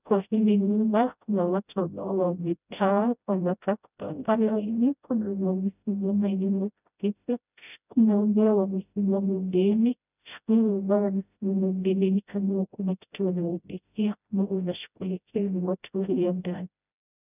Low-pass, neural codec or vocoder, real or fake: 3.6 kHz; codec, 16 kHz, 0.5 kbps, FreqCodec, smaller model; fake